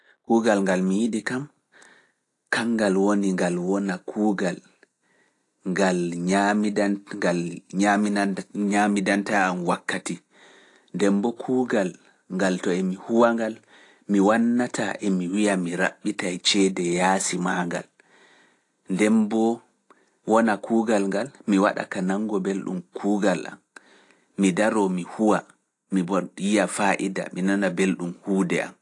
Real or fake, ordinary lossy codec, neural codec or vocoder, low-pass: real; AAC, 48 kbps; none; 10.8 kHz